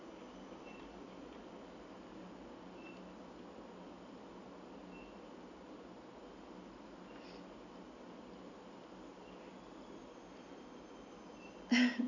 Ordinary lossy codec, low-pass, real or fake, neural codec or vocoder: none; 7.2 kHz; real; none